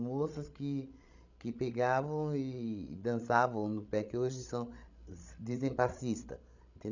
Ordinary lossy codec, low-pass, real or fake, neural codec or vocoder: none; 7.2 kHz; fake; codec, 16 kHz, 16 kbps, FreqCodec, larger model